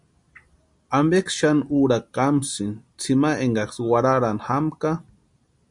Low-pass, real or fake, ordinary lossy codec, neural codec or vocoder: 10.8 kHz; real; MP3, 64 kbps; none